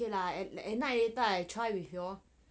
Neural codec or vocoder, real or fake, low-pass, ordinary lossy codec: none; real; none; none